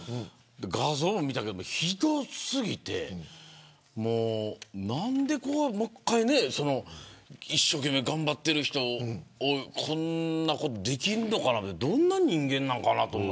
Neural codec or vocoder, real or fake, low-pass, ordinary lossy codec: none; real; none; none